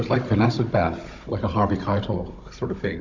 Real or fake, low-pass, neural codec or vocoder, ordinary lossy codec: fake; 7.2 kHz; codec, 16 kHz, 16 kbps, FunCodec, trained on Chinese and English, 50 frames a second; MP3, 48 kbps